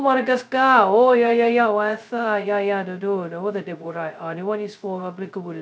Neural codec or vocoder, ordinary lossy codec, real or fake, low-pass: codec, 16 kHz, 0.2 kbps, FocalCodec; none; fake; none